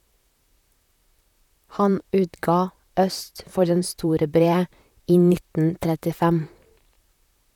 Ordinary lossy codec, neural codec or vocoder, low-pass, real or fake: none; vocoder, 44.1 kHz, 128 mel bands, Pupu-Vocoder; 19.8 kHz; fake